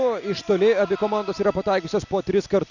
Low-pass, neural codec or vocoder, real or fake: 7.2 kHz; none; real